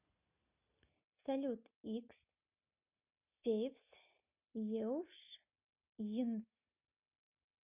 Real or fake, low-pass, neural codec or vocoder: real; 3.6 kHz; none